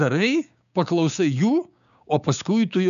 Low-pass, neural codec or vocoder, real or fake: 7.2 kHz; codec, 16 kHz, 6 kbps, DAC; fake